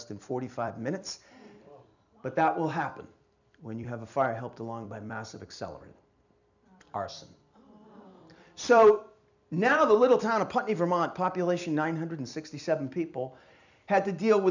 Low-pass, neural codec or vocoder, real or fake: 7.2 kHz; vocoder, 44.1 kHz, 128 mel bands every 256 samples, BigVGAN v2; fake